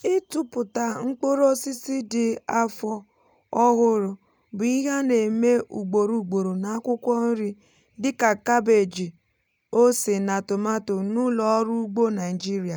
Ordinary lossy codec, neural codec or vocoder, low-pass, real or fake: none; none; none; real